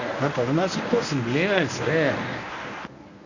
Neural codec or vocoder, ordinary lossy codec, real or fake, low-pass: codec, 24 kHz, 0.9 kbps, WavTokenizer, medium speech release version 1; none; fake; 7.2 kHz